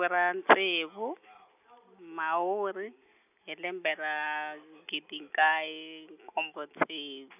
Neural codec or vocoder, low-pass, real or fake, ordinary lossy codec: none; 3.6 kHz; real; none